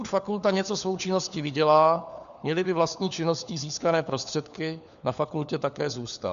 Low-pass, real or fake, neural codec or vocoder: 7.2 kHz; fake; codec, 16 kHz, 4 kbps, FunCodec, trained on LibriTTS, 50 frames a second